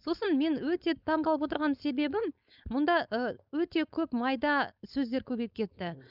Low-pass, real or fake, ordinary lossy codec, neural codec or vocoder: 5.4 kHz; fake; none; codec, 16 kHz, 4.8 kbps, FACodec